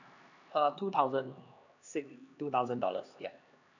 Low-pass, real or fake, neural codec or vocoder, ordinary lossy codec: 7.2 kHz; fake; codec, 16 kHz, 2 kbps, X-Codec, HuBERT features, trained on LibriSpeech; none